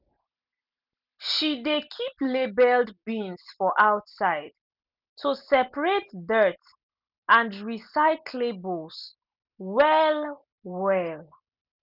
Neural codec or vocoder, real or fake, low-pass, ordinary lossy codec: none; real; 5.4 kHz; none